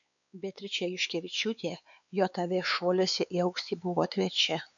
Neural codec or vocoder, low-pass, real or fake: codec, 16 kHz, 4 kbps, X-Codec, WavLM features, trained on Multilingual LibriSpeech; 7.2 kHz; fake